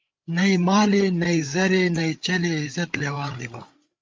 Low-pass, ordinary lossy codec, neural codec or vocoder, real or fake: 7.2 kHz; Opus, 32 kbps; codec, 16 kHz, 8 kbps, FreqCodec, larger model; fake